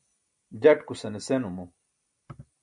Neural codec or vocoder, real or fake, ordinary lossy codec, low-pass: none; real; MP3, 64 kbps; 9.9 kHz